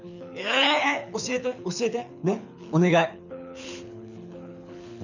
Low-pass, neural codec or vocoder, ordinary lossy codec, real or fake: 7.2 kHz; codec, 24 kHz, 6 kbps, HILCodec; none; fake